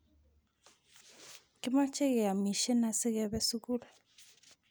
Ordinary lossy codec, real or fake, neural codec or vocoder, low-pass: none; real; none; none